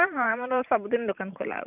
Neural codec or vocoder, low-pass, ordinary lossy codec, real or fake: vocoder, 44.1 kHz, 128 mel bands every 512 samples, BigVGAN v2; 3.6 kHz; none; fake